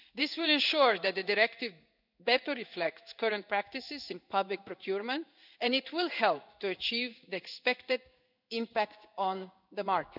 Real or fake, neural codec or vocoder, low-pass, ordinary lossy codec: fake; codec, 16 kHz in and 24 kHz out, 1 kbps, XY-Tokenizer; 5.4 kHz; none